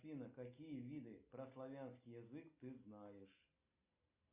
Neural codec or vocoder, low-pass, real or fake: none; 3.6 kHz; real